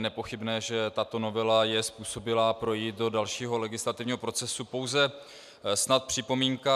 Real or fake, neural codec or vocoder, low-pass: real; none; 14.4 kHz